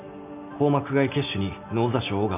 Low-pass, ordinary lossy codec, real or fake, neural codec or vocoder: 3.6 kHz; none; real; none